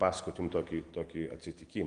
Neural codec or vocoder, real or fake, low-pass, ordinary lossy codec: none; real; 9.9 kHz; Opus, 24 kbps